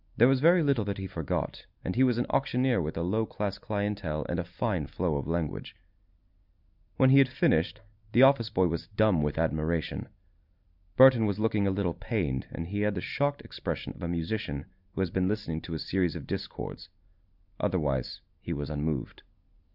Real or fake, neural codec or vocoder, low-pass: real; none; 5.4 kHz